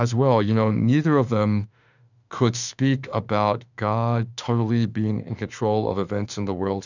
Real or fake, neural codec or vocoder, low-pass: fake; autoencoder, 48 kHz, 32 numbers a frame, DAC-VAE, trained on Japanese speech; 7.2 kHz